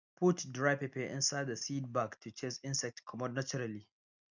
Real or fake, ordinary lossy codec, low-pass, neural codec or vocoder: real; none; 7.2 kHz; none